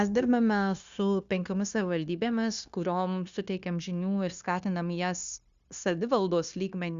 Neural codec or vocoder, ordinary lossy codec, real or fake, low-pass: codec, 16 kHz, 0.9 kbps, LongCat-Audio-Codec; Opus, 64 kbps; fake; 7.2 kHz